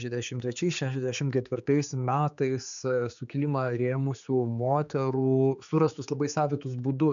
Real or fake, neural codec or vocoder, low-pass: fake; codec, 16 kHz, 4 kbps, X-Codec, HuBERT features, trained on general audio; 7.2 kHz